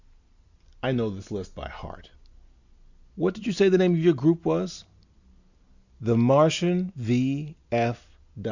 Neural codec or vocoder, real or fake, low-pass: none; real; 7.2 kHz